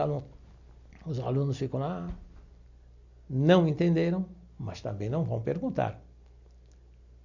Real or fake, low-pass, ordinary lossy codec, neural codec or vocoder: real; 7.2 kHz; none; none